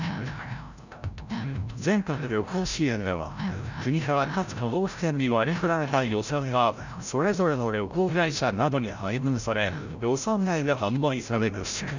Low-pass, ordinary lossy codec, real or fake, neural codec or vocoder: 7.2 kHz; none; fake; codec, 16 kHz, 0.5 kbps, FreqCodec, larger model